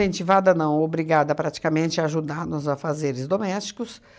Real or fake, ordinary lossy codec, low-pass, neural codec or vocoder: real; none; none; none